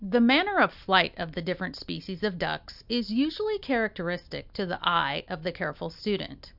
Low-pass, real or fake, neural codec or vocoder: 5.4 kHz; real; none